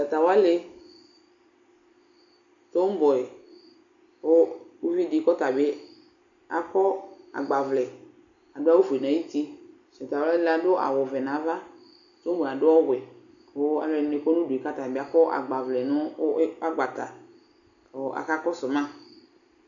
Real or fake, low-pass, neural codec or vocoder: real; 7.2 kHz; none